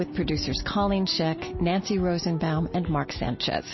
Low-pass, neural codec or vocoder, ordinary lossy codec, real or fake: 7.2 kHz; none; MP3, 24 kbps; real